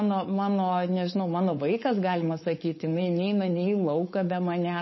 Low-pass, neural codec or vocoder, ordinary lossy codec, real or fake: 7.2 kHz; codec, 16 kHz, 4.8 kbps, FACodec; MP3, 24 kbps; fake